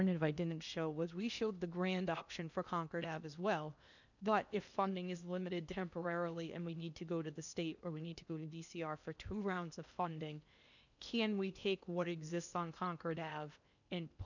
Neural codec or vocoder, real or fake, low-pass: codec, 16 kHz in and 24 kHz out, 0.8 kbps, FocalCodec, streaming, 65536 codes; fake; 7.2 kHz